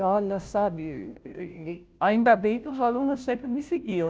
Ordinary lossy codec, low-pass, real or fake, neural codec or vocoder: none; none; fake; codec, 16 kHz, 0.5 kbps, FunCodec, trained on Chinese and English, 25 frames a second